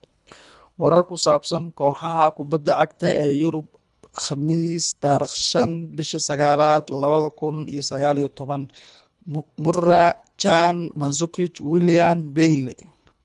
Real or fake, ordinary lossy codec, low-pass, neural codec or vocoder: fake; none; 10.8 kHz; codec, 24 kHz, 1.5 kbps, HILCodec